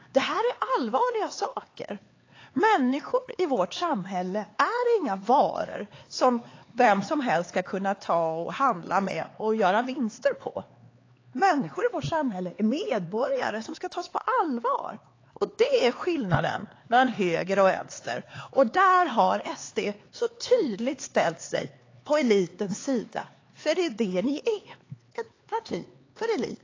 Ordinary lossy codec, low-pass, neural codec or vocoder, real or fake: AAC, 32 kbps; 7.2 kHz; codec, 16 kHz, 4 kbps, X-Codec, HuBERT features, trained on LibriSpeech; fake